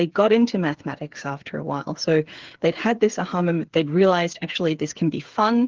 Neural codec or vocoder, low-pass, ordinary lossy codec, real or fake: codec, 16 kHz, 8 kbps, FreqCodec, smaller model; 7.2 kHz; Opus, 16 kbps; fake